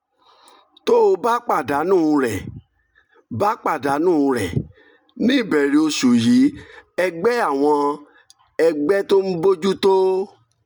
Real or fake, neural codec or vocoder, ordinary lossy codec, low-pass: real; none; none; none